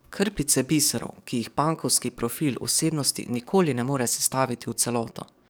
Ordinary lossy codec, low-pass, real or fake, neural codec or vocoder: none; none; fake; codec, 44.1 kHz, 7.8 kbps, DAC